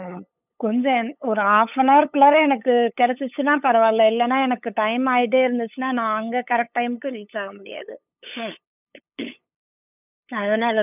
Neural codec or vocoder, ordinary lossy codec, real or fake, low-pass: codec, 16 kHz, 8 kbps, FunCodec, trained on LibriTTS, 25 frames a second; none; fake; 3.6 kHz